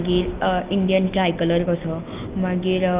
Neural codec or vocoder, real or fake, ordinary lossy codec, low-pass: none; real; Opus, 24 kbps; 3.6 kHz